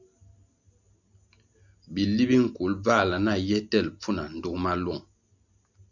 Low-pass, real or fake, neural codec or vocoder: 7.2 kHz; real; none